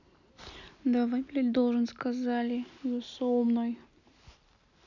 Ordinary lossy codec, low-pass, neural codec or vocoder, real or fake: none; 7.2 kHz; none; real